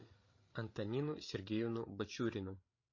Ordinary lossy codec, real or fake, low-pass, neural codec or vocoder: MP3, 32 kbps; fake; 7.2 kHz; codec, 16 kHz, 8 kbps, FreqCodec, larger model